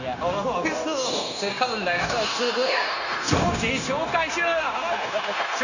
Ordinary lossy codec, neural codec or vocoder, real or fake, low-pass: none; codec, 16 kHz, 0.9 kbps, LongCat-Audio-Codec; fake; 7.2 kHz